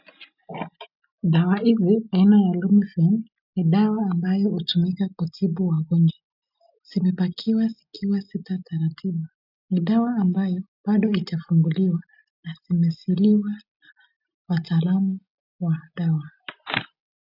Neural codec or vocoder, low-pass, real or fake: none; 5.4 kHz; real